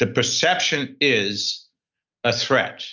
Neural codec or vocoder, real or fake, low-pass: none; real; 7.2 kHz